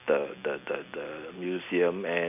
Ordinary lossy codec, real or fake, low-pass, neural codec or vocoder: none; real; 3.6 kHz; none